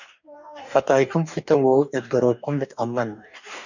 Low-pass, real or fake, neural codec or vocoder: 7.2 kHz; fake; codec, 44.1 kHz, 2.6 kbps, DAC